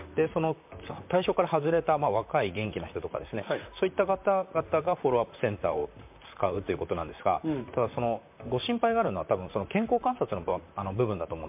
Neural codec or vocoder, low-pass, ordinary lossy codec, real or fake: vocoder, 44.1 kHz, 128 mel bands, Pupu-Vocoder; 3.6 kHz; MP3, 32 kbps; fake